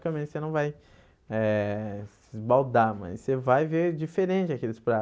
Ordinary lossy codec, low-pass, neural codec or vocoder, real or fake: none; none; none; real